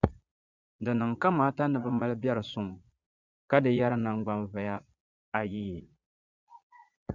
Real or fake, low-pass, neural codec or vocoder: fake; 7.2 kHz; vocoder, 22.05 kHz, 80 mel bands, Vocos